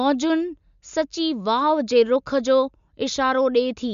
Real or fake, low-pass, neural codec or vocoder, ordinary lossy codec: real; 7.2 kHz; none; MP3, 64 kbps